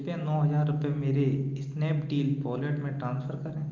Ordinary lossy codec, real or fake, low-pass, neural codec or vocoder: Opus, 32 kbps; real; 7.2 kHz; none